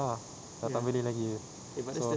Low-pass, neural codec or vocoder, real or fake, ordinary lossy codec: none; none; real; none